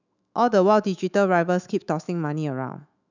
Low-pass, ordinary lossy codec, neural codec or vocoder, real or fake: 7.2 kHz; none; autoencoder, 48 kHz, 128 numbers a frame, DAC-VAE, trained on Japanese speech; fake